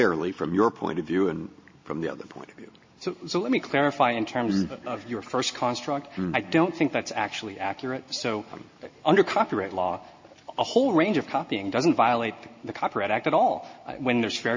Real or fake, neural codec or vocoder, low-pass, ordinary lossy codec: real; none; 7.2 kHz; MP3, 32 kbps